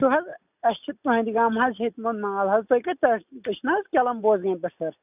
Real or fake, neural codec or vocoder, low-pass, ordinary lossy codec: real; none; 3.6 kHz; none